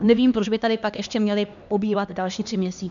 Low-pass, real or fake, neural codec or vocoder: 7.2 kHz; fake; codec, 16 kHz, 2 kbps, X-Codec, HuBERT features, trained on LibriSpeech